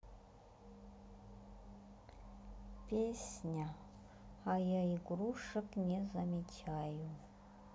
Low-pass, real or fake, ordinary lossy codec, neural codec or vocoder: none; real; none; none